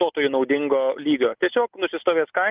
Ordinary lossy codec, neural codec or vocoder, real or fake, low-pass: Opus, 24 kbps; none; real; 3.6 kHz